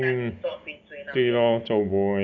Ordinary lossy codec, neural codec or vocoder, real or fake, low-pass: none; none; real; 7.2 kHz